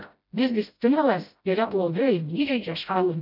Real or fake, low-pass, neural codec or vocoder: fake; 5.4 kHz; codec, 16 kHz, 0.5 kbps, FreqCodec, smaller model